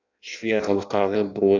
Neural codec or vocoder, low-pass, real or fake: codec, 16 kHz in and 24 kHz out, 0.6 kbps, FireRedTTS-2 codec; 7.2 kHz; fake